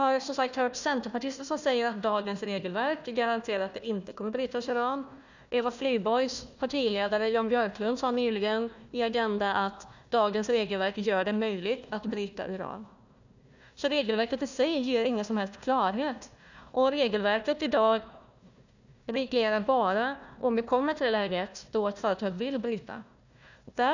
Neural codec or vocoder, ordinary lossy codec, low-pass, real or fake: codec, 16 kHz, 1 kbps, FunCodec, trained on Chinese and English, 50 frames a second; none; 7.2 kHz; fake